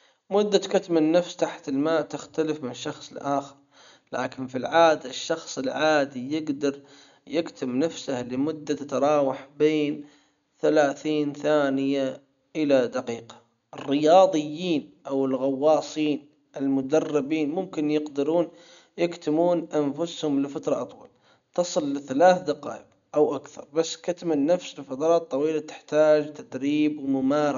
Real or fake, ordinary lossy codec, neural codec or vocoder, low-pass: real; none; none; 7.2 kHz